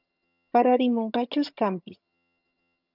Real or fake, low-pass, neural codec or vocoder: fake; 5.4 kHz; vocoder, 22.05 kHz, 80 mel bands, HiFi-GAN